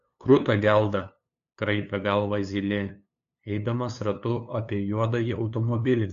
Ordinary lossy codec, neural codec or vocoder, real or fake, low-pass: AAC, 64 kbps; codec, 16 kHz, 2 kbps, FunCodec, trained on LibriTTS, 25 frames a second; fake; 7.2 kHz